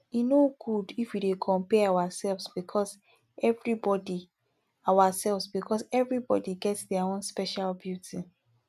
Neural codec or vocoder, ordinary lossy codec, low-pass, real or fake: none; none; 14.4 kHz; real